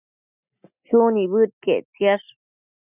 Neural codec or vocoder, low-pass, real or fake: none; 3.6 kHz; real